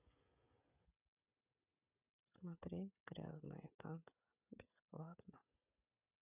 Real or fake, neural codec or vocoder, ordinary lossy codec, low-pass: fake; codec, 16 kHz, 16 kbps, FreqCodec, smaller model; none; 3.6 kHz